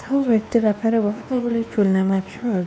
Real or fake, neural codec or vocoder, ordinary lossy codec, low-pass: fake; codec, 16 kHz, 2 kbps, X-Codec, WavLM features, trained on Multilingual LibriSpeech; none; none